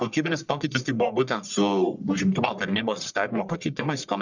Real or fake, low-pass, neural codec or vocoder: fake; 7.2 kHz; codec, 44.1 kHz, 1.7 kbps, Pupu-Codec